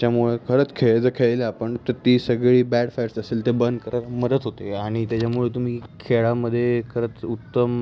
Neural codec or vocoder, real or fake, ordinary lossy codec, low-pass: none; real; none; none